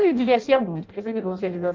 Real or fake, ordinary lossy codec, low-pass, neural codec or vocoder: fake; Opus, 24 kbps; 7.2 kHz; codec, 16 kHz in and 24 kHz out, 0.6 kbps, FireRedTTS-2 codec